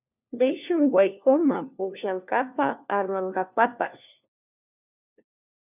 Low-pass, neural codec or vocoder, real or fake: 3.6 kHz; codec, 16 kHz, 1 kbps, FunCodec, trained on LibriTTS, 50 frames a second; fake